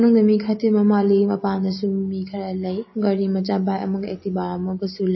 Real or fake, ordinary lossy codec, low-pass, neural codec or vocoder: real; MP3, 24 kbps; 7.2 kHz; none